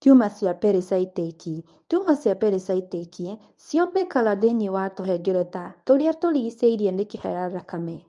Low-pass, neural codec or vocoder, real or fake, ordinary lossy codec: 10.8 kHz; codec, 24 kHz, 0.9 kbps, WavTokenizer, medium speech release version 1; fake; none